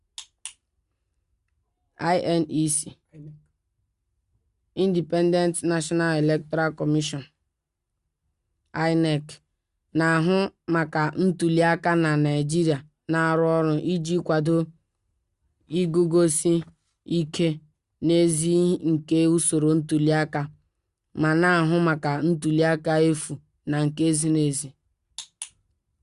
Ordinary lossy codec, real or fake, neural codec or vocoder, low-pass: Opus, 64 kbps; real; none; 10.8 kHz